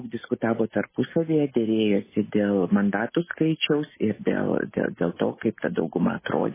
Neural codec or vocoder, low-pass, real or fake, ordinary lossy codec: none; 3.6 kHz; real; MP3, 16 kbps